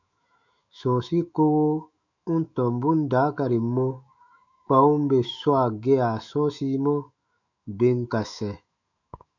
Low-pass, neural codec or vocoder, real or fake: 7.2 kHz; autoencoder, 48 kHz, 128 numbers a frame, DAC-VAE, trained on Japanese speech; fake